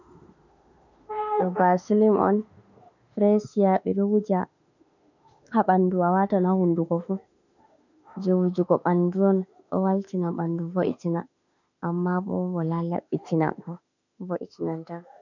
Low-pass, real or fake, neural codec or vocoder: 7.2 kHz; fake; autoencoder, 48 kHz, 32 numbers a frame, DAC-VAE, trained on Japanese speech